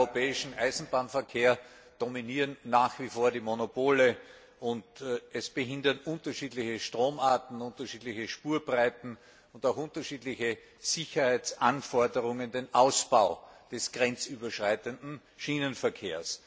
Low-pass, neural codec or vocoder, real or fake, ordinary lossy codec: none; none; real; none